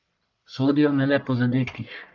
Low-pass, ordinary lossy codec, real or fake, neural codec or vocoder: 7.2 kHz; none; fake; codec, 44.1 kHz, 1.7 kbps, Pupu-Codec